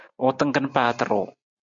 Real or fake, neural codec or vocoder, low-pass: real; none; 7.2 kHz